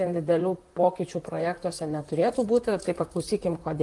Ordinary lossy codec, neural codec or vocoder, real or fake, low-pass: Opus, 24 kbps; vocoder, 44.1 kHz, 128 mel bands, Pupu-Vocoder; fake; 10.8 kHz